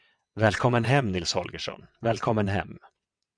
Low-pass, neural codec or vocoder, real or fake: 9.9 kHz; vocoder, 22.05 kHz, 80 mel bands, WaveNeXt; fake